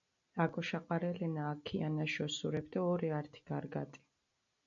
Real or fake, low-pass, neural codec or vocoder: real; 7.2 kHz; none